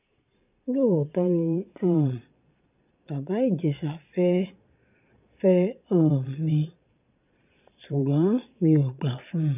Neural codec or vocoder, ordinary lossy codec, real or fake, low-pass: vocoder, 22.05 kHz, 80 mel bands, Vocos; none; fake; 3.6 kHz